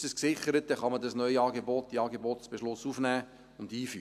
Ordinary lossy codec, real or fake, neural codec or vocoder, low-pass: none; real; none; 14.4 kHz